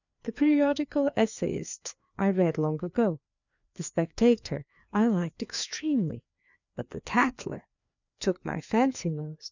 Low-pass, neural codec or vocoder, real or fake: 7.2 kHz; codec, 16 kHz, 2 kbps, FreqCodec, larger model; fake